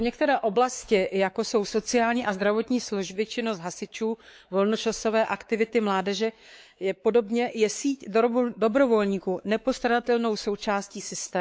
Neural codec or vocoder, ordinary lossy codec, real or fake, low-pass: codec, 16 kHz, 4 kbps, X-Codec, WavLM features, trained on Multilingual LibriSpeech; none; fake; none